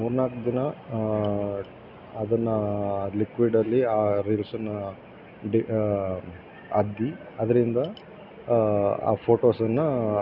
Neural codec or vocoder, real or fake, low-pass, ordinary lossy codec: none; real; 5.4 kHz; none